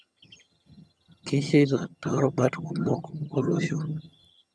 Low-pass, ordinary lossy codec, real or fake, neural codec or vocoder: none; none; fake; vocoder, 22.05 kHz, 80 mel bands, HiFi-GAN